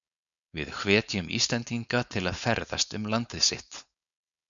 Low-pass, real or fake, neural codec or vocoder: 7.2 kHz; fake; codec, 16 kHz, 4.8 kbps, FACodec